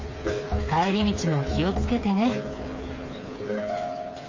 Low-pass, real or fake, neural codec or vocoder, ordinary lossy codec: 7.2 kHz; fake; codec, 16 kHz, 4 kbps, FreqCodec, smaller model; MP3, 32 kbps